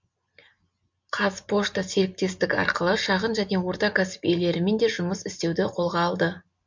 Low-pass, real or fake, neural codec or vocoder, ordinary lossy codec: 7.2 kHz; real; none; MP3, 48 kbps